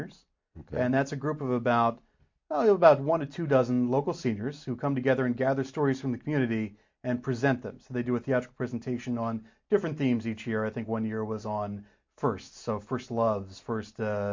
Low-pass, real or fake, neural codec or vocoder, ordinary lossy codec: 7.2 kHz; real; none; MP3, 48 kbps